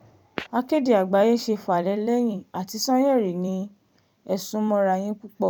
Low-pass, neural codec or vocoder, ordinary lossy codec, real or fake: 19.8 kHz; vocoder, 44.1 kHz, 128 mel bands every 256 samples, BigVGAN v2; none; fake